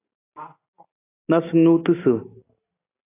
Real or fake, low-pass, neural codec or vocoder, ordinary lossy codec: real; 3.6 kHz; none; AAC, 16 kbps